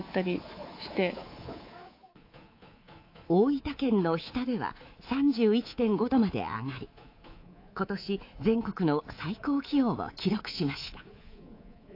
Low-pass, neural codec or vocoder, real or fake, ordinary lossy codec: 5.4 kHz; codec, 24 kHz, 3.1 kbps, DualCodec; fake; AAC, 32 kbps